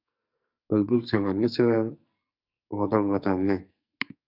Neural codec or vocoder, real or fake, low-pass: codec, 44.1 kHz, 2.6 kbps, SNAC; fake; 5.4 kHz